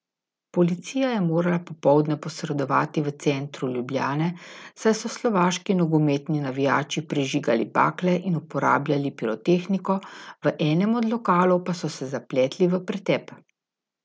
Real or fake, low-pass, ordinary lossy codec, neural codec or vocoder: real; none; none; none